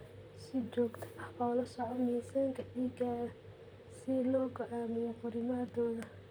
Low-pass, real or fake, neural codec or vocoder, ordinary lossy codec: none; fake; vocoder, 44.1 kHz, 128 mel bands, Pupu-Vocoder; none